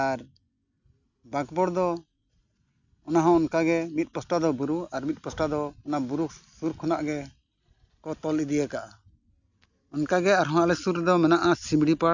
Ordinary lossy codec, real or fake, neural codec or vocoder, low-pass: none; real; none; 7.2 kHz